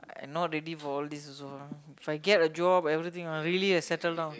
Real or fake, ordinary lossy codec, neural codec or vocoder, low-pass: real; none; none; none